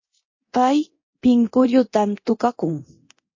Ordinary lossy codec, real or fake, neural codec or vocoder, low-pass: MP3, 32 kbps; fake; codec, 24 kHz, 0.9 kbps, DualCodec; 7.2 kHz